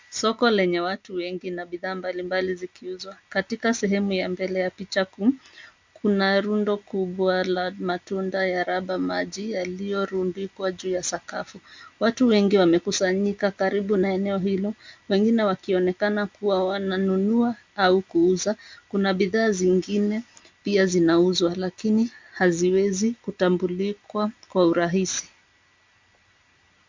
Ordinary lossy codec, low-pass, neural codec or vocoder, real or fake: MP3, 64 kbps; 7.2 kHz; none; real